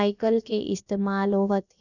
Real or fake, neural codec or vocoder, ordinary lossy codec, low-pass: fake; codec, 16 kHz, about 1 kbps, DyCAST, with the encoder's durations; none; 7.2 kHz